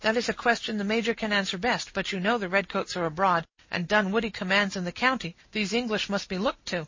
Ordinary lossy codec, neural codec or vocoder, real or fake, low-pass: MP3, 32 kbps; none; real; 7.2 kHz